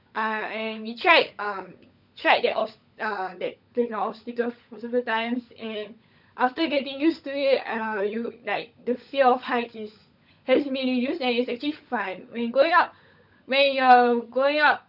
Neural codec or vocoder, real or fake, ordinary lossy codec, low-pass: codec, 16 kHz, 16 kbps, FunCodec, trained on LibriTTS, 50 frames a second; fake; none; 5.4 kHz